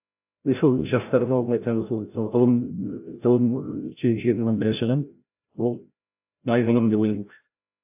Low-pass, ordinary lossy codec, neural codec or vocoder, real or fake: 3.6 kHz; AAC, 32 kbps; codec, 16 kHz, 0.5 kbps, FreqCodec, larger model; fake